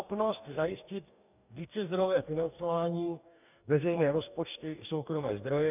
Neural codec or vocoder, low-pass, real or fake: codec, 44.1 kHz, 2.6 kbps, DAC; 3.6 kHz; fake